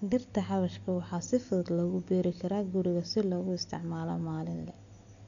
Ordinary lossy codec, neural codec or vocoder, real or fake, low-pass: none; none; real; 7.2 kHz